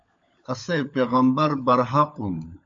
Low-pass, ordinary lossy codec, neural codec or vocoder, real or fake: 7.2 kHz; MP3, 48 kbps; codec, 16 kHz, 16 kbps, FunCodec, trained on LibriTTS, 50 frames a second; fake